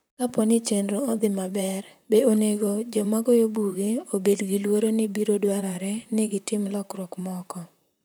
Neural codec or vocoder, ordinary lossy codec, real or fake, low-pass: vocoder, 44.1 kHz, 128 mel bands, Pupu-Vocoder; none; fake; none